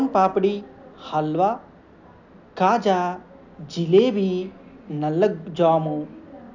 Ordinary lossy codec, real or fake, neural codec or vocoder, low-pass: none; real; none; 7.2 kHz